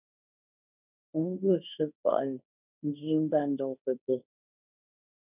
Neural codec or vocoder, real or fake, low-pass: codec, 16 kHz, 1.1 kbps, Voila-Tokenizer; fake; 3.6 kHz